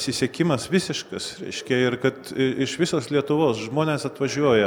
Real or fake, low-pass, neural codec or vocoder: real; 19.8 kHz; none